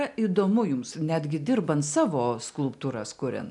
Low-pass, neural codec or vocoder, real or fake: 10.8 kHz; none; real